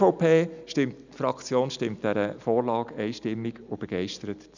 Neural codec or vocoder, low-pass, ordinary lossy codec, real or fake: none; 7.2 kHz; none; real